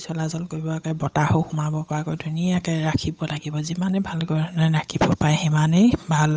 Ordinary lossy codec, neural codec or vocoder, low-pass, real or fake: none; codec, 16 kHz, 8 kbps, FunCodec, trained on Chinese and English, 25 frames a second; none; fake